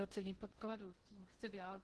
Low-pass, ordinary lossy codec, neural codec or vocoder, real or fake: 10.8 kHz; Opus, 16 kbps; codec, 16 kHz in and 24 kHz out, 0.6 kbps, FocalCodec, streaming, 2048 codes; fake